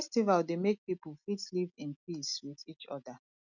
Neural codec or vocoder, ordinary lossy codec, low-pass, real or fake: none; none; 7.2 kHz; real